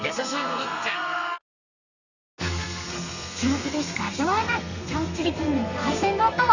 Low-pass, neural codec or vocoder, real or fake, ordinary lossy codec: 7.2 kHz; codec, 32 kHz, 1.9 kbps, SNAC; fake; none